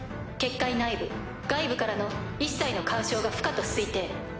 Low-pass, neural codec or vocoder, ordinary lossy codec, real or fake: none; none; none; real